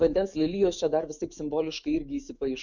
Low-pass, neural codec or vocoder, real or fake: 7.2 kHz; autoencoder, 48 kHz, 128 numbers a frame, DAC-VAE, trained on Japanese speech; fake